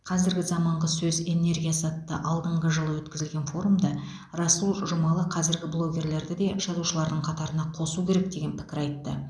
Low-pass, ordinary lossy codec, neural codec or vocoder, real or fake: none; none; none; real